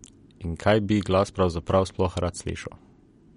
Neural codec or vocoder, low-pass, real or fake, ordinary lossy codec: none; 10.8 kHz; real; MP3, 48 kbps